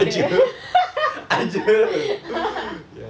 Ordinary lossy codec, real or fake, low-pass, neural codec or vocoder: none; real; none; none